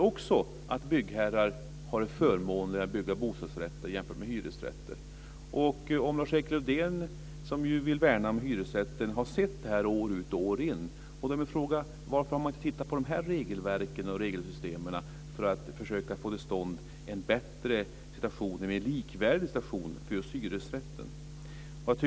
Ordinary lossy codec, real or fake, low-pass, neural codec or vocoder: none; real; none; none